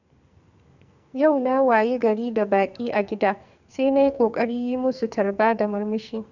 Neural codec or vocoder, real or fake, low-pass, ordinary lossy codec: codec, 44.1 kHz, 2.6 kbps, SNAC; fake; 7.2 kHz; none